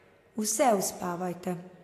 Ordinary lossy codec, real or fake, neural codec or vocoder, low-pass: AAC, 64 kbps; real; none; 14.4 kHz